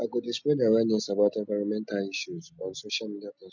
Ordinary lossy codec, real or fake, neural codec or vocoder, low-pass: none; real; none; 7.2 kHz